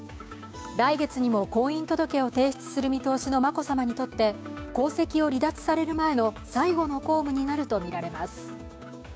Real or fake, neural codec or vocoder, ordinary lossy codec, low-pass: fake; codec, 16 kHz, 6 kbps, DAC; none; none